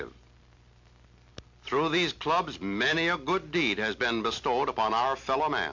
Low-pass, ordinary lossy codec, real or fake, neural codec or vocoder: 7.2 kHz; MP3, 48 kbps; real; none